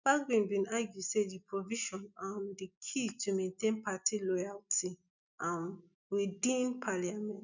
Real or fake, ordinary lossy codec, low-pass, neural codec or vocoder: real; none; 7.2 kHz; none